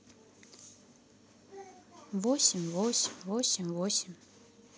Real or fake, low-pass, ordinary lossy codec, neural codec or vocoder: real; none; none; none